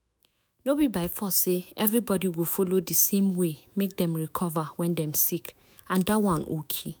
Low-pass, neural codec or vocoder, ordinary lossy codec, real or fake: none; autoencoder, 48 kHz, 128 numbers a frame, DAC-VAE, trained on Japanese speech; none; fake